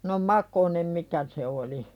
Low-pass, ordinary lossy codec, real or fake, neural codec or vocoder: 19.8 kHz; none; real; none